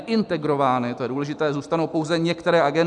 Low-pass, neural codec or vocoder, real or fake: 10.8 kHz; none; real